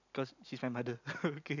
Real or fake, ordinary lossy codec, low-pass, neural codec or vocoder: real; none; 7.2 kHz; none